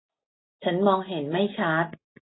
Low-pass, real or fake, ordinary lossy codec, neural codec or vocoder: 7.2 kHz; real; AAC, 16 kbps; none